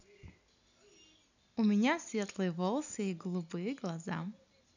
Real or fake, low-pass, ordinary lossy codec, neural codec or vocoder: real; 7.2 kHz; none; none